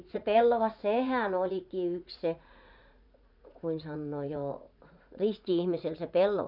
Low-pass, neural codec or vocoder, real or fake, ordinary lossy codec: 5.4 kHz; vocoder, 44.1 kHz, 80 mel bands, Vocos; fake; none